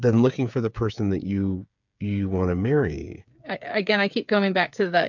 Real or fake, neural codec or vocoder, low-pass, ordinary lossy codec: fake; codec, 16 kHz, 8 kbps, FreqCodec, smaller model; 7.2 kHz; MP3, 64 kbps